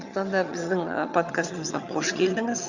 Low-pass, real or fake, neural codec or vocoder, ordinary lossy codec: 7.2 kHz; fake; vocoder, 22.05 kHz, 80 mel bands, HiFi-GAN; Opus, 64 kbps